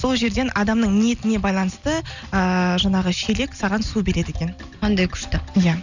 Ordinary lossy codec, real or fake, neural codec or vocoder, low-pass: none; real; none; 7.2 kHz